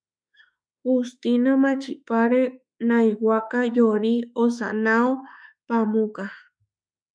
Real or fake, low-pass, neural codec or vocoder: fake; 9.9 kHz; autoencoder, 48 kHz, 32 numbers a frame, DAC-VAE, trained on Japanese speech